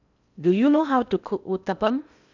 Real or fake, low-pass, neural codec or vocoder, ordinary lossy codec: fake; 7.2 kHz; codec, 16 kHz in and 24 kHz out, 0.6 kbps, FocalCodec, streaming, 4096 codes; none